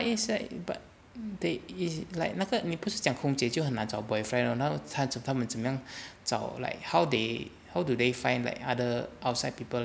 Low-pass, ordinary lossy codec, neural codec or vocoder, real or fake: none; none; none; real